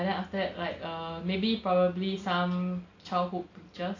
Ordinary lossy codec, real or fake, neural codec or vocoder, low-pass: AAC, 48 kbps; real; none; 7.2 kHz